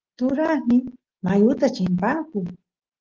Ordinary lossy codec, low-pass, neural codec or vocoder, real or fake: Opus, 16 kbps; 7.2 kHz; none; real